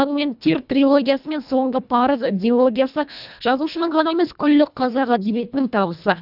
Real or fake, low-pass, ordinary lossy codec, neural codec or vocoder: fake; 5.4 kHz; none; codec, 24 kHz, 1.5 kbps, HILCodec